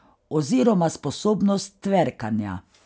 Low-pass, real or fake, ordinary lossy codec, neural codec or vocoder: none; real; none; none